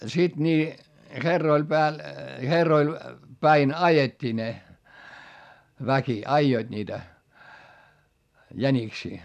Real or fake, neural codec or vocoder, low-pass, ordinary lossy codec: real; none; 14.4 kHz; none